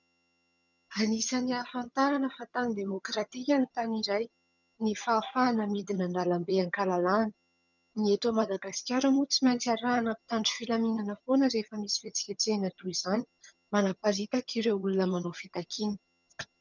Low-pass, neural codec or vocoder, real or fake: 7.2 kHz; vocoder, 22.05 kHz, 80 mel bands, HiFi-GAN; fake